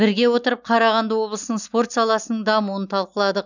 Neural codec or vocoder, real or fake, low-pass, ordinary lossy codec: none; real; 7.2 kHz; none